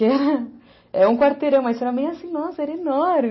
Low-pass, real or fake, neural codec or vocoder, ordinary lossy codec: 7.2 kHz; real; none; MP3, 24 kbps